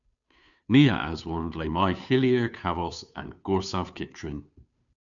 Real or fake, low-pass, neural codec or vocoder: fake; 7.2 kHz; codec, 16 kHz, 2 kbps, FunCodec, trained on Chinese and English, 25 frames a second